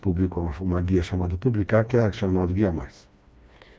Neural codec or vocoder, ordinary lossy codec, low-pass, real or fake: codec, 16 kHz, 2 kbps, FreqCodec, smaller model; none; none; fake